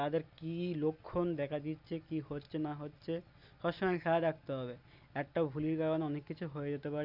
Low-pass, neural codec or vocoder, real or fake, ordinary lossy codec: 5.4 kHz; none; real; none